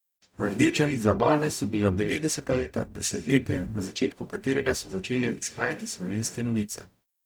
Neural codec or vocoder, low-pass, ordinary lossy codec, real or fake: codec, 44.1 kHz, 0.9 kbps, DAC; none; none; fake